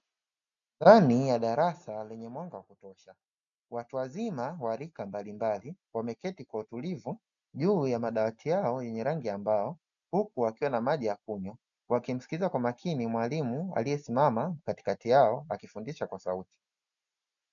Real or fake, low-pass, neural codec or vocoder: real; 7.2 kHz; none